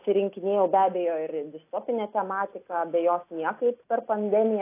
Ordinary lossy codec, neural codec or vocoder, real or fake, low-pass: AAC, 24 kbps; none; real; 3.6 kHz